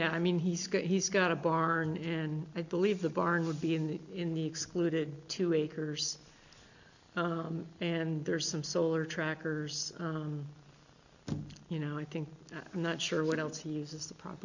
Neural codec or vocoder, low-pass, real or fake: none; 7.2 kHz; real